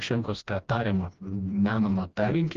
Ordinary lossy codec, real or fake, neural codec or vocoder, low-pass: Opus, 32 kbps; fake; codec, 16 kHz, 1 kbps, FreqCodec, smaller model; 7.2 kHz